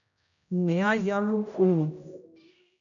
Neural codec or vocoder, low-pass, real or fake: codec, 16 kHz, 0.5 kbps, X-Codec, HuBERT features, trained on general audio; 7.2 kHz; fake